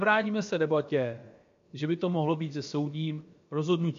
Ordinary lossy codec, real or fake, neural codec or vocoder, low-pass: MP3, 48 kbps; fake; codec, 16 kHz, about 1 kbps, DyCAST, with the encoder's durations; 7.2 kHz